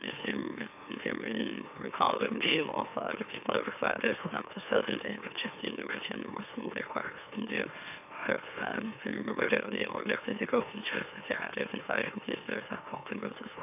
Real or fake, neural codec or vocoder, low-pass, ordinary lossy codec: fake; autoencoder, 44.1 kHz, a latent of 192 numbers a frame, MeloTTS; 3.6 kHz; none